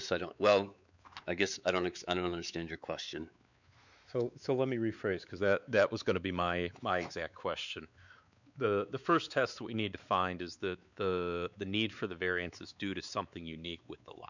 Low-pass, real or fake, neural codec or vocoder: 7.2 kHz; fake; codec, 16 kHz, 4 kbps, X-Codec, WavLM features, trained on Multilingual LibriSpeech